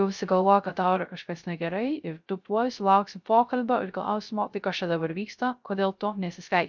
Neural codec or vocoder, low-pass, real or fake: codec, 16 kHz, 0.3 kbps, FocalCodec; 7.2 kHz; fake